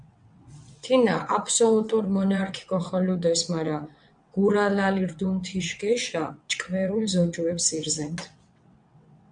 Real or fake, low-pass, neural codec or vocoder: fake; 9.9 kHz; vocoder, 22.05 kHz, 80 mel bands, WaveNeXt